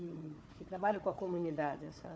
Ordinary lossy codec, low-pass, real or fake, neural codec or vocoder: none; none; fake; codec, 16 kHz, 4 kbps, FunCodec, trained on Chinese and English, 50 frames a second